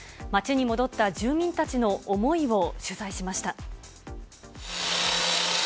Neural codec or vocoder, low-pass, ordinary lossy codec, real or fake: none; none; none; real